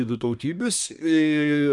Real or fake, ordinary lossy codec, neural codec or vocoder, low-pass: fake; MP3, 96 kbps; codec, 24 kHz, 1 kbps, SNAC; 10.8 kHz